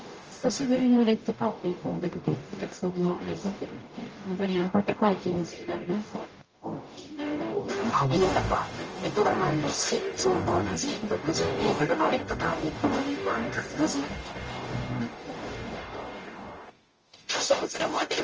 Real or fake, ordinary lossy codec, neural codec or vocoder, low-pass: fake; Opus, 24 kbps; codec, 44.1 kHz, 0.9 kbps, DAC; 7.2 kHz